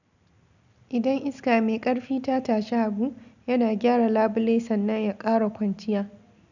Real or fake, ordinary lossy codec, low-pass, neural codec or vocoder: real; none; 7.2 kHz; none